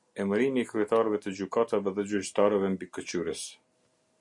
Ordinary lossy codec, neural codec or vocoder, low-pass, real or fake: MP3, 48 kbps; none; 10.8 kHz; real